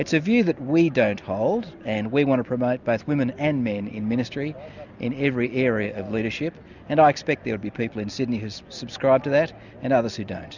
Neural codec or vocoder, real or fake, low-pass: none; real; 7.2 kHz